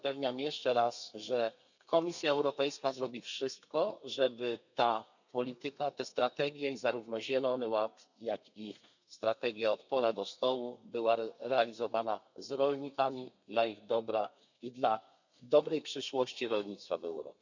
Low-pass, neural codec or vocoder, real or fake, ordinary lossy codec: 7.2 kHz; codec, 32 kHz, 1.9 kbps, SNAC; fake; none